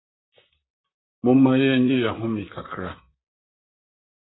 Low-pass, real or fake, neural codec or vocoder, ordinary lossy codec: 7.2 kHz; fake; vocoder, 44.1 kHz, 128 mel bands, Pupu-Vocoder; AAC, 16 kbps